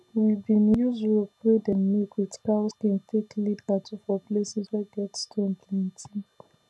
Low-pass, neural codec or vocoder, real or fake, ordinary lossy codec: none; none; real; none